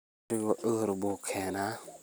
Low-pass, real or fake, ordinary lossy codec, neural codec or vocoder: none; real; none; none